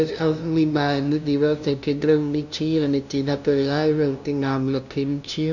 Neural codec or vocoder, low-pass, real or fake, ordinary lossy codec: codec, 16 kHz, 0.5 kbps, FunCodec, trained on LibriTTS, 25 frames a second; 7.2 kHz; fake; none